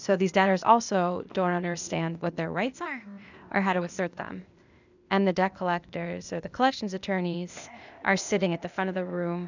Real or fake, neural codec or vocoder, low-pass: fake; codec, 16 kHz, 0.8 kbps, ZipCodec; 7.2 kHz